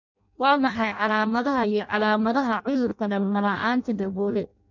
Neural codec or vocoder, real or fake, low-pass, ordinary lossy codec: codec, 16 kHz in and 24 kHz out, 0.6 kbps, FireRedTTS-2 codec; fake; 7.2 kHz; none